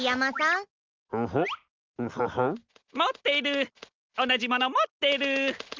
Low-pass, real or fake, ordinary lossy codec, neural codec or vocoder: 7.2 kHz; real; Opus, 24 kbps; none